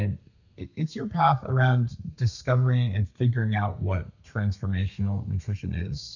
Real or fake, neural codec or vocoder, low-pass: fake; codec, 32 kHz, 1.9 kbps, SNAC; 7.2 kHz